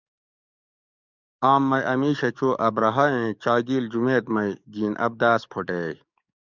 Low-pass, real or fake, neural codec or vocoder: 7.2 kHz; fake; codec, 44.1 kHz, 7.8 kbps, DAC